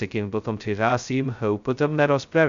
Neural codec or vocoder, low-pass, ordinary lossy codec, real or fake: codec, 16 kHz, 0.2 kbps, FocalCodec; 7.2 kHz; Opus, 64 kbps; fake